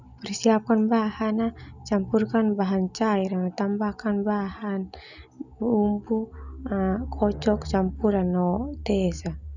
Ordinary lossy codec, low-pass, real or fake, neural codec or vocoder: none; 7.2 kHz; real; none